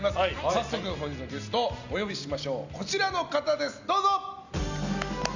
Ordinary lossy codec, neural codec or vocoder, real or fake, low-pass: none; none; real; 7.2 kHz